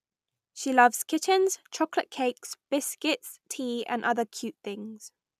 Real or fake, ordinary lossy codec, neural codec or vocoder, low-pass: real; none; none; 14.4 kHz